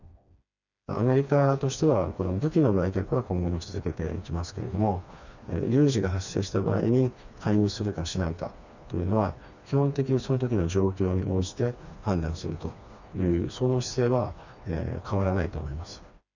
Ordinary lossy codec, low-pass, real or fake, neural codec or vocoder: none; 7.2 kHz; fake; codec, 16 kHz, 2 kbps, FreqCodec, smaller model